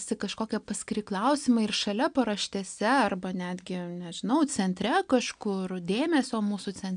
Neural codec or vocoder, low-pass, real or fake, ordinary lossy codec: none; 9.9 kHz; real; MP3, 96 kbps